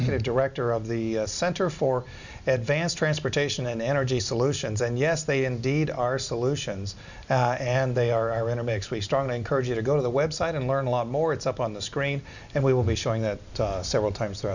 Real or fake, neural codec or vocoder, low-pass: real; none; 7.2 kHz